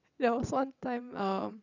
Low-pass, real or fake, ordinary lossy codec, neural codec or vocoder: 7.2 kHz; fake; none; vocoder, 22.05 kHz, 80 mel bands, WaveNeXt